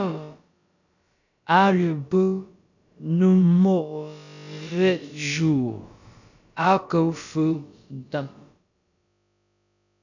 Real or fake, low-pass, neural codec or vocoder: fake; 7.2 kHz; codec, 16 kHz, about 1 kbps, DyCAST, with the encoder's durations